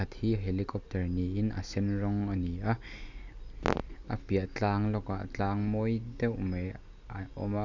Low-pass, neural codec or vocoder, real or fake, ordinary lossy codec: 7.2 kHz; none; real; none